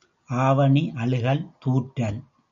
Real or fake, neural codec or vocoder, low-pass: real; none; 7.2 kHz